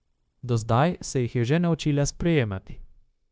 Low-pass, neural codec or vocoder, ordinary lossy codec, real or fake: none; codec, 16 kHz, 0.9 kbps, LongCat-Audio-Codec; none; fake